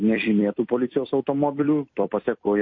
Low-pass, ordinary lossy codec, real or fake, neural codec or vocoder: 7.2 kHz; MP3, 32 kbps; fake; vocoder, 44.1 kHz, 128 mel bands every 256 samples, BigVGAN v2